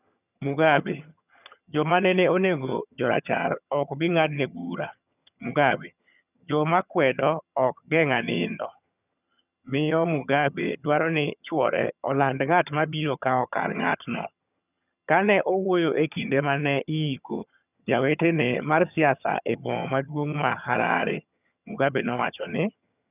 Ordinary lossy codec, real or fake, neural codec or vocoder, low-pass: none; fake; vocoder, 22.05 kHz, 80 mel bands, HiFi-GAN; 3.6 kHz